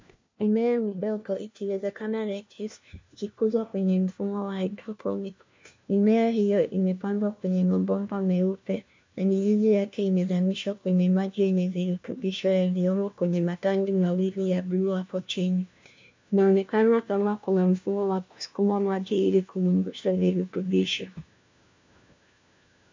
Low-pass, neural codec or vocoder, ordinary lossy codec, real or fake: 7.2 kHz; codec, 16 kHz, 1 kbps, FunCodec, trained on LibriTTS, 50 frames a second; MP3, 64 kbps; fake